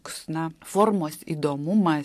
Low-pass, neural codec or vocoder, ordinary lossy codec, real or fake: 14.4 kHz; none; AAC, 64 kbps; real